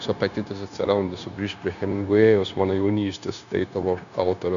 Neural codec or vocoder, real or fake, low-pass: codec, 16 kHz, 0.9 kbps, LongCat-Audio-Codec; fake; 7.2 kHz